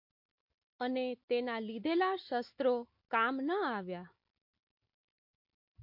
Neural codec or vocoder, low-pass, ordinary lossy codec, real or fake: none; 5.4 kHz; MP3, 32 kbps; real